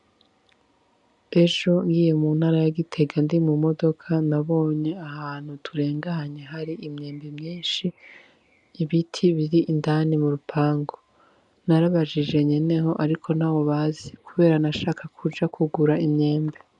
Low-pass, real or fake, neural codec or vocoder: 10.8 kHz; real; none